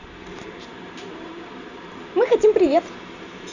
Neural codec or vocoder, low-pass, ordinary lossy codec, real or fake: vocoder, 44.1 kHz, 128 mel bands every 512 samples, BigVGAN v2; 7.2 kHz; none; fake